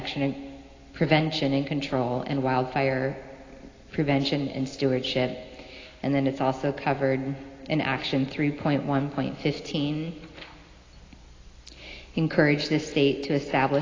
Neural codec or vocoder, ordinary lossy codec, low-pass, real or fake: none; AAC, 32 kbps; 7.2 kHz; real